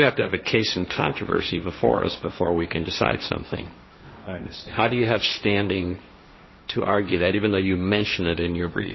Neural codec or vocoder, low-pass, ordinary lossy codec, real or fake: codec, 16 kHz, 1.1 kbps, Voila-Tokenizer; 7.2 kHz; MP3, 24 kbps; fake